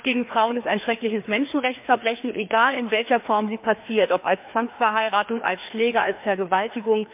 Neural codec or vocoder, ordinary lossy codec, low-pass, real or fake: codec, 16 kHz, 2 kbps, FreqCodec, larger model; MP3, 32 kbps; 3.6 kHz; fake